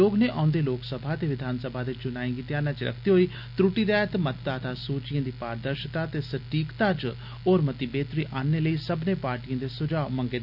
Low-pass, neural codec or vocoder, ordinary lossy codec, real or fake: 5.4 kHz; none; none; real